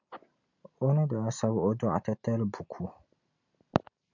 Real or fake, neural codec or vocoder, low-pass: real; none; 7.2 kHz